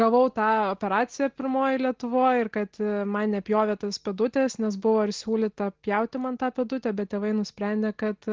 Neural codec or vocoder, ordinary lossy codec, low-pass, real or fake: none; Opus, 16 kbps; 7.2 kHz; real